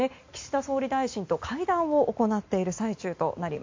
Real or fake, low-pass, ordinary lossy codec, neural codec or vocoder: real; 7.2 kHz; MP3, 48 kbps; none